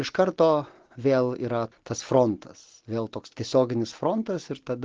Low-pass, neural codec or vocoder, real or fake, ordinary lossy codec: 7.2 kHz; none; real; Opus, 16 kbps